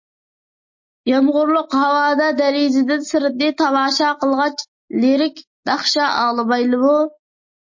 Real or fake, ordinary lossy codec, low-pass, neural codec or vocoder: real; MP3, 32 kbps; 7.2 kHz; none